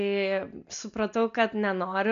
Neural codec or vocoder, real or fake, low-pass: none; real; 7.2 kHz